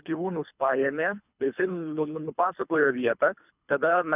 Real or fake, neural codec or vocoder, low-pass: fake; codec, 24 kHz, 3 kbps, HILCodec; 3.6 kHz